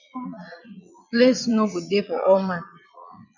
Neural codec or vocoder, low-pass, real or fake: vocoder, 22.05 kHz, 80 mel bands, Vocos; 7.2 kHz; fake